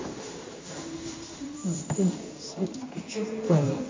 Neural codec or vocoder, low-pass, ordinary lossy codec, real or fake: codec, 16 kHz in and 24 kHz out, 1 kbps, XY-Tokenizer; 7.2 kHz; MP3, 48 kbps; fake